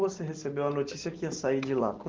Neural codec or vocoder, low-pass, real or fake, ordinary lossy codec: none; 7.2 kHz; real; Opus, 16 kbps